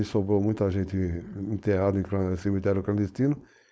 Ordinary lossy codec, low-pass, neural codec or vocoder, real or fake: none; none; codec, 16 kHz, 4.8 kbps, FACodec; fake